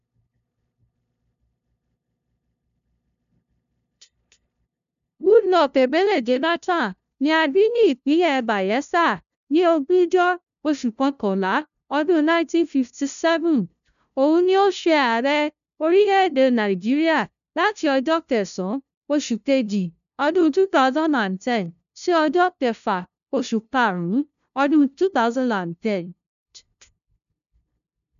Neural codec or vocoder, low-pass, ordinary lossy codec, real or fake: codec, 16 kHz, 0.5 kbps, FunCodec, trained on LibriTTS, 25 frames a second; 7.2 kHz; none; fake